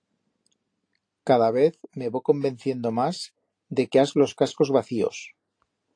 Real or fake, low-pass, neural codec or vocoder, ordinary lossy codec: real; 9.9 kHz; none; AAC, 48 kbps